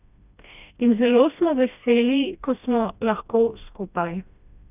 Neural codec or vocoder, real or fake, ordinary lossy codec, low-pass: codec, 16 kHz, 1 kbps, FreqCodec, smaller model; fake; none; 3.6 kHz